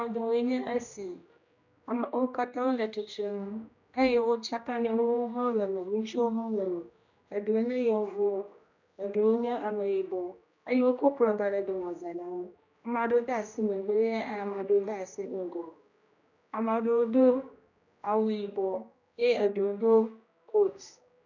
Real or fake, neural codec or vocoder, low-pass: fake; codec, 16 kHz, 1 kbps, X-Codec, HuBERT features, trained on general audio; 7.2 kHz